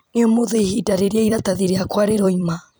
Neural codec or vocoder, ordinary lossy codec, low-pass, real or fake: vocoder, 44.1 kHz, 128 mel bands every 256 samples, BigVGAN v2; none; none; fake